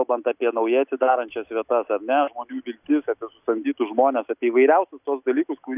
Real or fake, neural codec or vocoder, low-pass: real; none; 3.6 kHz